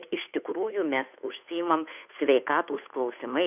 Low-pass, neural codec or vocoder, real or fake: 3.6 kHz; codec, 16 kHz, 2 kbps, FunCodec, trained on Chinese and English, 25 frames a second; fake